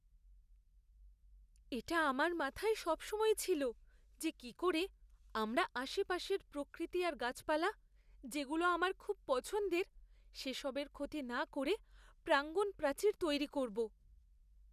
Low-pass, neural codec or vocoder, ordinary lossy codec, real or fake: 14.4 kHz; none; none; real